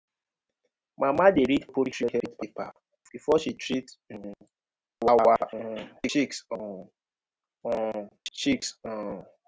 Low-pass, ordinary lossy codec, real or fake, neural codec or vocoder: none; none; real; none